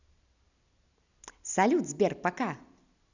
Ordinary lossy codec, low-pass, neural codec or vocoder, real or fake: none; 7.2 kHz; none; real